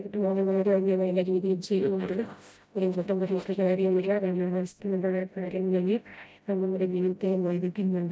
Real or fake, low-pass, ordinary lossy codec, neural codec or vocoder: fake; none; none; codec, 16 kHz, 0.5 kbps, FreqCodec, smaller model